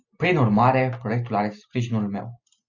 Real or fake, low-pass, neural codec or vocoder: real; 7.2 kHz; none